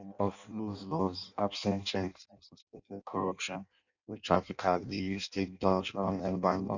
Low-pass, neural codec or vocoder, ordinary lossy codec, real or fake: 7.2 kHz; codec, 16 kHz in and 24 kHz out, 0.6 kbps, FireRedTTS-2 codec; none; fake